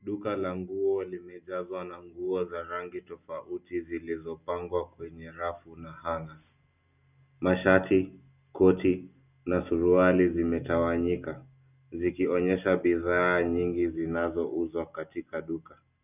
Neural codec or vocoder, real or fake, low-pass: none; real; 3.6 kHz